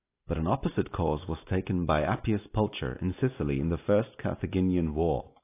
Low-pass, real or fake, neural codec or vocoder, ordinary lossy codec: 3.6 kHz; real; none; AAC, 24 kbps